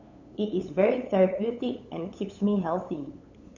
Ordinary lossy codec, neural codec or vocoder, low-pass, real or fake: none; codec, 16 kHz, 8 kbps, FunCodec, trained on LibriTTS, 25 frames a second; 7.2 kHz; fake